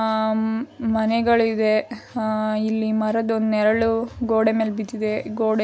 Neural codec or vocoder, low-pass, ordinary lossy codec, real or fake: none; none; none; real